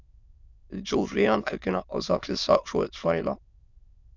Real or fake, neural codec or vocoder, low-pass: fake; autoencoder, 22.05 kHz, a latent of 192 numbers a frame, VITS, trained on many speakers; 7.2 kHz